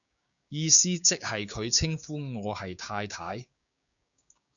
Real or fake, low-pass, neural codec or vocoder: fake; 7.2 kHz; codec, 16 kHz, 6 kbps, DAC